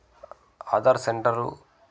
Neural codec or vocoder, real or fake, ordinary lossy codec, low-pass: none; real; none; none